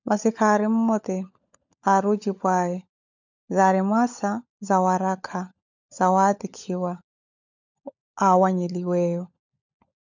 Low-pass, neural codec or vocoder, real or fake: 7.2 kHz; codec, 16 kHz, 16 kbps, FunCodec, trained on LibriTTS, 50 frames a second; fake